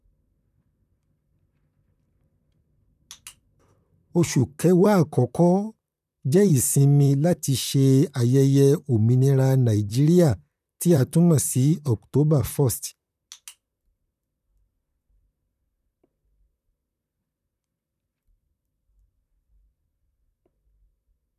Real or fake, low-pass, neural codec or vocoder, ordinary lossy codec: fake; 14.4 kHz; vocoder, 44.1 kHz, 128 mel bands, Pupu-Vocoder; none